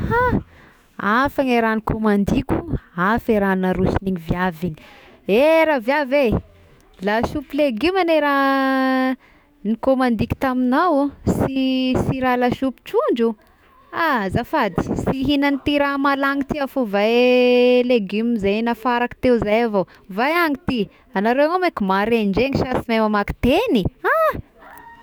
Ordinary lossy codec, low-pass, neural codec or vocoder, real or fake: none; none; autoencoder, 48 kHz, 128 numbers a frame, DAC-VAE, trained on Japanese speech; fake